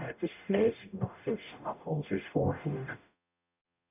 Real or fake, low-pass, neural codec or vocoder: fake; 3.6 kHz; codec, 44.1 kHz, 0.9 kbps, DAC